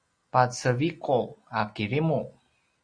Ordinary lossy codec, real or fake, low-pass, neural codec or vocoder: Opus, 64 kbps; real; 9.9 kHz; none